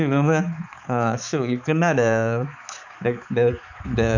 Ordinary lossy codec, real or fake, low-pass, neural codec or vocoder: none; fake; 7.2 kHz; codec, 16 kHz, 4 kbps, X-Codec, HuBERT features, trained on balanced general audio